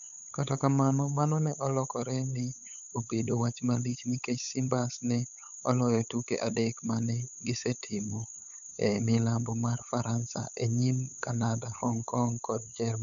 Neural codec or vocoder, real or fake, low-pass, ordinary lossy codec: codec, 16 kHz, 8 kbps, FunCodec, trained on LibriTTS, 25 frames a second; fake; 7.2 kHz; none